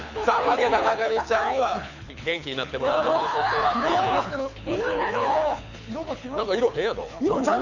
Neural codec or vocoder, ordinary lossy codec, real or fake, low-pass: codec, 24 kHz, 6 kbps, HILCodec; none; fake; 7.2 kHz